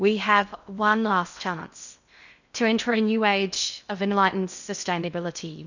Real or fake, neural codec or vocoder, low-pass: fake; codec, 16 kHz in and 24 kHz out, 0.6 kbps, FocalCodec, streaming, 2048 codes; 7.2 kHz